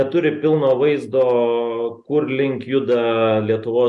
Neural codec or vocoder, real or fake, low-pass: none; real; 10.8 kHz